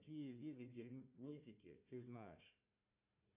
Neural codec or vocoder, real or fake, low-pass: codec, 16 kHz, 1 kbps, FunCodec, trained on Chinese and English, 50 frames a second; fake; 3.6 kHz